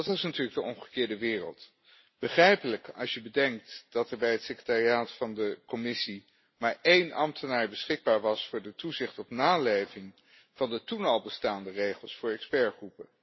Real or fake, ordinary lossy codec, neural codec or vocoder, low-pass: real; MP3, 24 kbps; none; 7.2 kHz